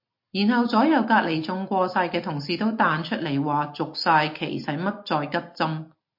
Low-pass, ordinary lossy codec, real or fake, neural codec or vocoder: 5.4 kHz; MP3, 32 kbps; real; none